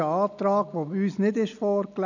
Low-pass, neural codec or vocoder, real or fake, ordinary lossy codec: 7.2 kHz; none; real; none